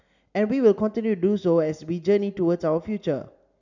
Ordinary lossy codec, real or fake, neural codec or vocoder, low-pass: none; real; none; 7.2 kHz